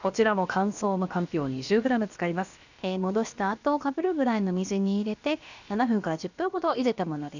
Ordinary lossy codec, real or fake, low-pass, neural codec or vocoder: none; fake; 7.2 kHz; codec, 16 kHz, about 1 kbps, DyCAST, with the encoder's durations